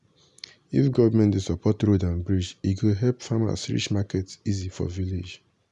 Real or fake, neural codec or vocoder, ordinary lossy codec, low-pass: real; none; none; 10.8 kHz